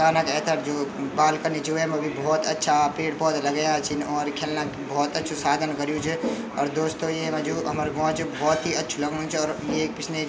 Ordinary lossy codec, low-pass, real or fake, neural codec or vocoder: none; none; real; none